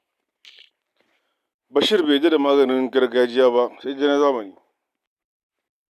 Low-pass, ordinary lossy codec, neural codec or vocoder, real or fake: 14.4 kHz; none; none; real